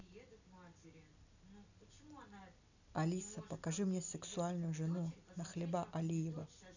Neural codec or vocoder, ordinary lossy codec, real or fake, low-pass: none; none; real; 7.2 kHz